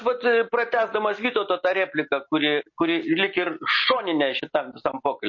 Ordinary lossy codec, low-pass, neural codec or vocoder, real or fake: MP3, 32 kbps; 7.2 kHz; none; real